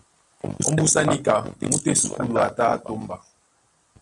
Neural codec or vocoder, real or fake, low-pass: none; real; 10.8 kHz